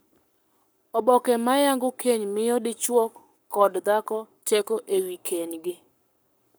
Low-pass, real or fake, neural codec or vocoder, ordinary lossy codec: none; fake; codec, 44.1 kHz, 7.8 kbps, Pupu-Codec; none